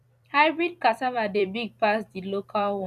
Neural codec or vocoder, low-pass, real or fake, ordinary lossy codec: vocoder, 44.1 kHz, 128 mel bands every 256 samples, BigVGAN v2; 14.4 kHz; fake; none